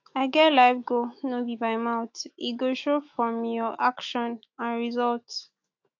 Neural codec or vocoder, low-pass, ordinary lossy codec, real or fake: autoencoder, 48 kHz, 128 numbers a frame, DAC-VAE, trained on Japanese speech; 7.2 kHz; AAC, 48 kbps; fake